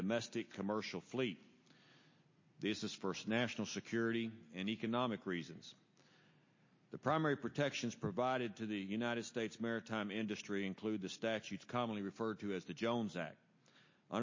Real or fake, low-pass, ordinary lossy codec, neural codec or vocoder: real; 7.2 kHz; MP3, 32 kbps; none